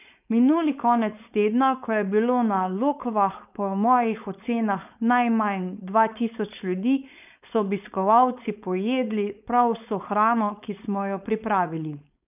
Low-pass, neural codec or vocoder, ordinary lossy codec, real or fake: 3.6 kHz; codec, 16 kHz, 4.8 kbps, FACodec; none; fake